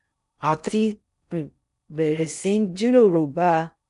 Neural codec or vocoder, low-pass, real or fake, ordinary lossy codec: codec, 16 kHz in and 24 kHz out, 0.6 kbps, FocalCodec, streaming, 4096 codes; 10.8 kHz; fake; none